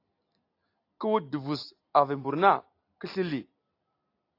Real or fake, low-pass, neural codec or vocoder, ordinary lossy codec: real; 5.4 kHz; none; AAC, 32 kbps